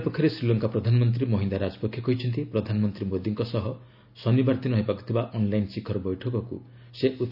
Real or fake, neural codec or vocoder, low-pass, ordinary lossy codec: real; none; 5.4 kHz; none